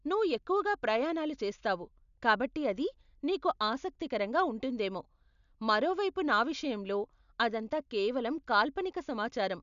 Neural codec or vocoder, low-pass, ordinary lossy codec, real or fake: none; 7.2 kHz; none; real